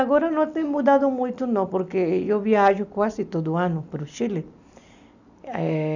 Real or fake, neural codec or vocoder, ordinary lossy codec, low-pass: real; none; none; 7.2 kHz